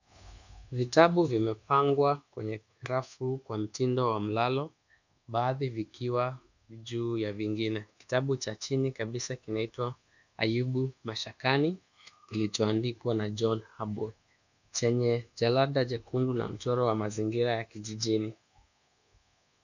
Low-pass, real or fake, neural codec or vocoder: 7.2 kHz; fake; codec, 24 kHz, 1.2 kbps, DualCodec